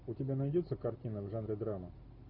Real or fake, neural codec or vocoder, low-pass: real; none; 5.4 kHz